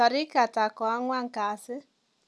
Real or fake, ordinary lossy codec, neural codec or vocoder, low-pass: real; none; none; none